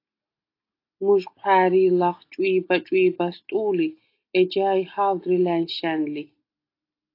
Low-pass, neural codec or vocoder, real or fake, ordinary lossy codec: 5.4 kHz; none; real; AAC, 32 kbps